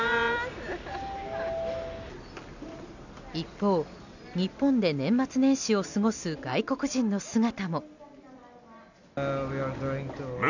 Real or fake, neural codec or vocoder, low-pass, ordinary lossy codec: real; none; 7.2 kHz; none